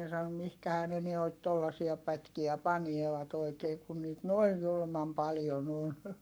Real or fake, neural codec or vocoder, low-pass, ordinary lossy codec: fake; codec, 44.1 kHz, 7.8 kbps, Pupu-Codec; none; none